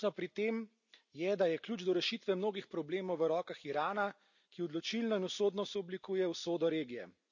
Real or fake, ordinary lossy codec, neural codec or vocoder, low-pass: real; none; none; 7.2 kHz